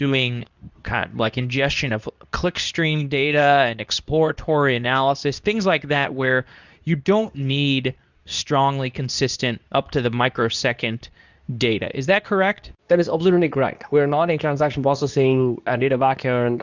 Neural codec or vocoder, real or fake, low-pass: codec, 24 kHz, 0.9 kbps, WavTokenizer, medium speech release version 2; fake; 7.2 kHz